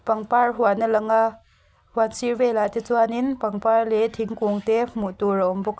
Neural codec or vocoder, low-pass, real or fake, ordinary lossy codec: none; none; real; none